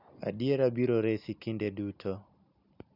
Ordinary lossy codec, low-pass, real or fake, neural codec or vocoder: none; 5.4 kHz; real; none